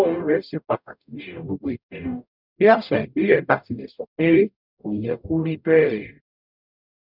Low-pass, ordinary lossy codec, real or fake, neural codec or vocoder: 5.4 kHz; none; fake; codec, 44.1 kHz, 0.9 kbps, DAC